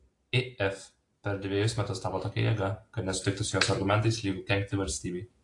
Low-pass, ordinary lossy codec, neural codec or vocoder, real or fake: 10.8 kHz; AAC, 48 kbps; none; real